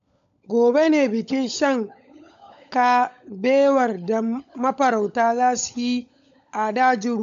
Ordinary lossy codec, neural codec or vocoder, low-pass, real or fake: MP3, 64 kbps; codec, 16 kHz, 16 kbps, FunCodec, trained on LibriTTS, 50 frames a second; 7.2 kHz; fake